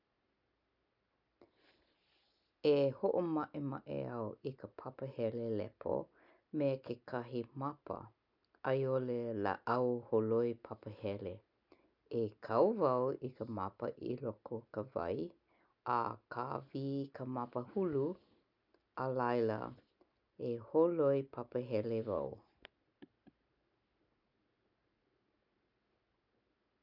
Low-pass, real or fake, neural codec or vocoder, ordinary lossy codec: 5.4 kHz; real; none; none